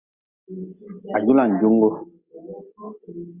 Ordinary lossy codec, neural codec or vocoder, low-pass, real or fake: Opus, 64 kbps; none; 3.6 kHz; real